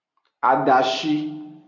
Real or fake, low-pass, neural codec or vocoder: real; 7.2 kHz; none